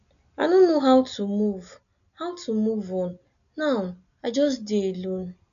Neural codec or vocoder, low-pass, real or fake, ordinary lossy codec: none; 7.2 kHz; real; none